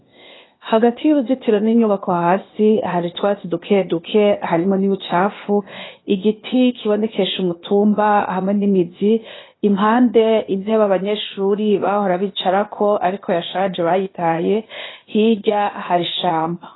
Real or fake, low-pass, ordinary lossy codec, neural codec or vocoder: fake; 7.2 kHz; AAC, 16 kbps; codec, 16 kHz, 0.8 kbps, ZipCodec